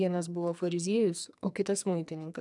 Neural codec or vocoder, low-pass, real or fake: codec, 44.1 kHz, 2.6 kbps, SNAC; 10.8 kHz; fake